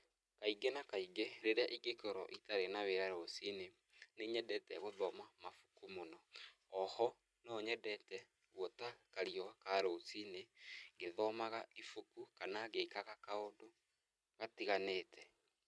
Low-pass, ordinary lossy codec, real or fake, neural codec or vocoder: none; none; real; none